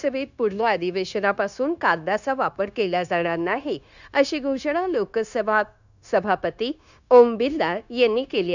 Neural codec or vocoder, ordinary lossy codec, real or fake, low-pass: codec, 16 kHz, 0.9 kbps, LongCat-Audio-Codec; none; fake; 7.2 kHz